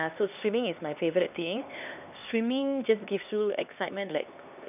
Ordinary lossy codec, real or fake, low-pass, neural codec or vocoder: none; fake; 3.6 kHz; codec, 16 kHz, 2 kbps, X-Codec, HuBERT features, trained on LibriSpeech